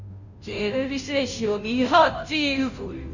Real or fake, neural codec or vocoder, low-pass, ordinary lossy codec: fake; codec, 16 kHz, 0.5 kbps, FunCodec, trained on Chinese and English, 25 frames a second; 7.2 kHz; none